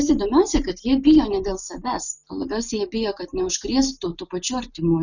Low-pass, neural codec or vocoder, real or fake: 7.2 kHz; none; real